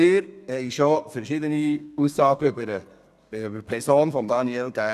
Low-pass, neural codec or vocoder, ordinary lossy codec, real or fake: 14.4 kHz; codec, 32 kHz, 1.9 kbps, SNAC; none; fake